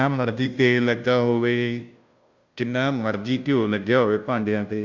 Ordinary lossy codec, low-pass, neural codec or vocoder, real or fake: Opus, 64 kbps; 7.2 kHz; codec, 16 kHz, 0.5 kbps, FunCodec, trained on Chinese and English, 25 frames a second; fake